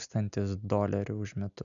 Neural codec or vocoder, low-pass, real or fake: none; 7.2 kHz; real